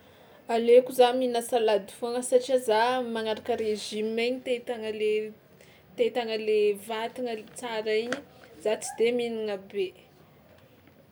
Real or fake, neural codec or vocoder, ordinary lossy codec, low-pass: real; none; none; none